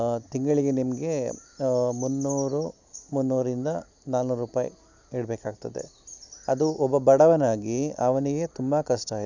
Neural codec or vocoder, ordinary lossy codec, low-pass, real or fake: none; none; 7.2 kHz; real